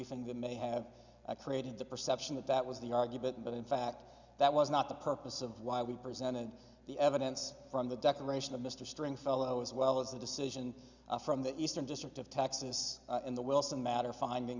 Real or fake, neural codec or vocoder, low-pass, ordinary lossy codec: real; none; 7.2 kHz; Opus, 64 kbps